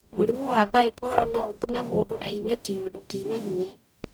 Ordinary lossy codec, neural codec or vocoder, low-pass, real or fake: none; codec, 44.1 kHz, 0.9 kbps, DAC; none; fake